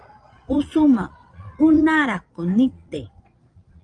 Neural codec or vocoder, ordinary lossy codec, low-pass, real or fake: vocoder, 22.05 kHz, 80 mel bands, Vocos; Opus, 32 kbps; 9.9 kHz; fake